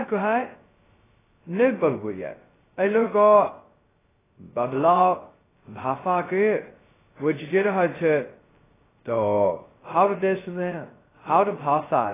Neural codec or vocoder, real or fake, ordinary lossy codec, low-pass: codec, 16 kHz, 0.2 kbps, FocalCodec; fake; AAC, 16 kbps; 3.6 kHz